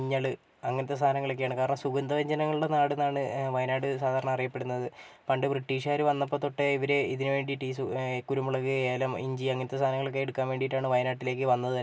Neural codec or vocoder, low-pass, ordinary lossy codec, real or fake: none; none; none; real